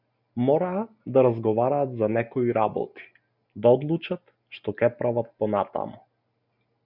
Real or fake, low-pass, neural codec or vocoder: real; 5.4 kHz; none